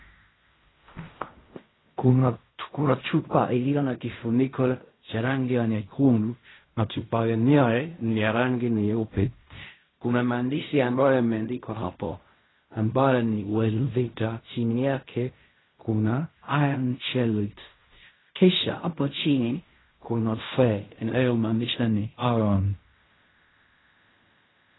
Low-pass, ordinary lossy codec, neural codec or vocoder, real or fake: 7.2 kHz; AAC, 16 kbps; codec, 16 kHz in and 24 kHz out, 0.4 kbps, LongCat-Audio-Codec, fine tuned four codebook decoder; fake